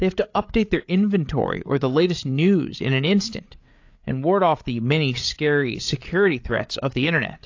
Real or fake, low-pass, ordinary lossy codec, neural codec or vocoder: fake; 7.2 kHz; AAC, 48 kbps; codec, 16 kHz, 8 kbps, FreqCodec, larger model